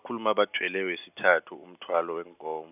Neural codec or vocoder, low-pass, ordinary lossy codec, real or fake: none; 3.6 kHz; none; real